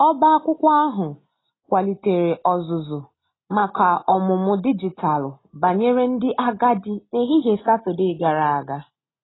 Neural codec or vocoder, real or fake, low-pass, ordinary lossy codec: none; real; 7.2 kHz; AAC, 16 kbps